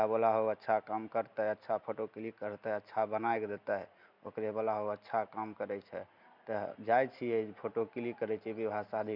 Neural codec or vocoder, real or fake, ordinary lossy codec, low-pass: none; real; none; 5.4 kHz